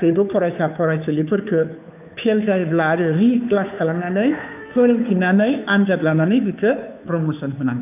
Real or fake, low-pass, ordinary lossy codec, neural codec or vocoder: fake; 3.6 kHz; none; codec, 16 kHz, 2 kbps, FunCodec, trained on Chinese and English, 25 frames a second